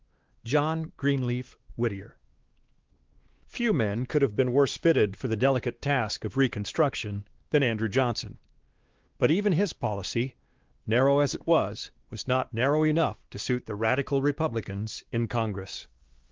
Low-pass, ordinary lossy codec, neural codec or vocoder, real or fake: 7.2 kHz; Opus, 16 kbps; codec, 16 kHz, 2 kbps, X-Codec, WavLM features, trained on Multilingual LibriSpeech; fake